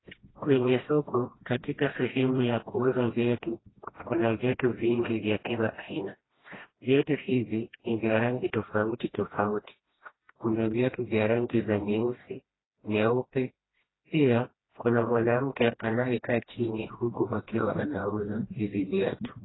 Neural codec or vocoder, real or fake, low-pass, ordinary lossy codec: codec, 16 kHz, 1 kbps, FreqCodec, smaller model; fake; 7.2 kHz; AAC, 16 kbps